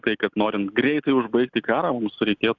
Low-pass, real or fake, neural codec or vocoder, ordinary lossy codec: 7.2 kHz; real; none; Opus, 64 kbps